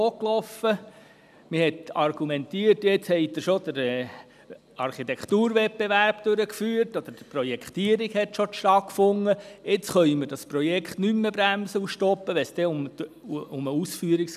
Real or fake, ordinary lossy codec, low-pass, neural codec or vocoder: real; none; 14.4 kHz; none